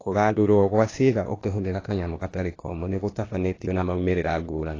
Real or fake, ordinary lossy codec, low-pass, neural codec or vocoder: fake; AAC, 32 kbps; 7.2 kHz; codec, 16 kHz, 0.8 kbps, ZipCodec